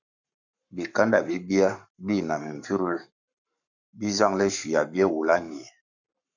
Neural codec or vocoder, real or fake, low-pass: codec, 44.1 kHz, 7.8 kbps, Pupu-Codec; fake; 7.2 kHz